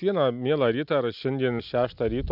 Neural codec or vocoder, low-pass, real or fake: none; 5.4 kHz; real